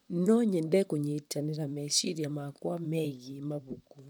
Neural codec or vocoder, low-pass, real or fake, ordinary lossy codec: vocoder, 44.1 kHz, 128 mel bands, Pupu-Vocoder; none; fake; none